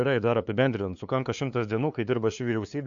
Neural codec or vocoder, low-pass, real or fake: codec, 16 kHz, 2 kbps, FunCodec, trained on LibriTTS, 25 frames a second; 7.2 kHz; fake